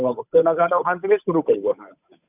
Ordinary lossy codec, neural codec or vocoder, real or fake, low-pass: none; codec, 16 kHz, 8 kbps, FunCodec, trained on Chinese and English, 25 frames a second; fake; 3.6 kHz